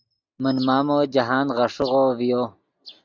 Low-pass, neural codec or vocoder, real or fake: 7.2 kHz; none; real